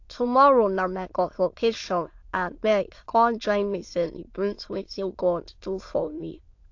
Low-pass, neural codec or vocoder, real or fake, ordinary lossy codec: 7.2 kHz; autoencoder, 22.05 kHz, a latent of 192 numbers a frame, VITS, trained on many speakers; fake; AAC, 48 kbps